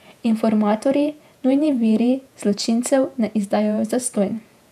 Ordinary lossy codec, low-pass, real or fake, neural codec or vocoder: none; 14.4 kHz; fake; vocoder, 48 kHz, 128 mel bands, Vocos